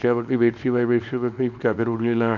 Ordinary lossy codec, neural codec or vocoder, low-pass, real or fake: none; codec, 24 kHz, 0.9 kbps, WavTokenizer, small release; 7.2 kHz; fake